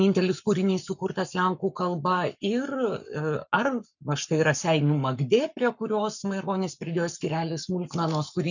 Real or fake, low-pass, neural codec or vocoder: fake; 7.2 kHz; codec, 44.1 kHz, 7.8 kbps, Pupu-Codec